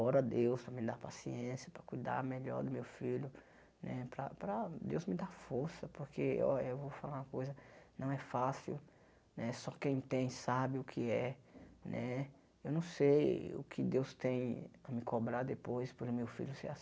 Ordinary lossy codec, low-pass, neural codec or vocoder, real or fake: none; none; none; real